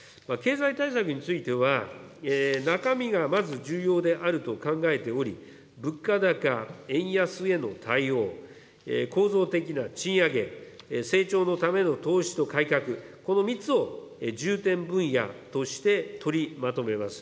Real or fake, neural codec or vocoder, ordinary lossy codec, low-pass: real; none; none; none